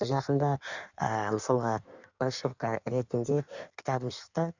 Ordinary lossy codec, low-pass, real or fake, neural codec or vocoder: none; 7.2 kHz; fake; codec, 16 kHz in and 24 kHz out, 1.1 kbps, FireRedTTS-2 codec